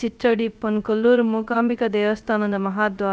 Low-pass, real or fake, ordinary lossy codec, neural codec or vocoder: none; fake; none; codec, 16 kHz, 0.3 kbps, FocalCodec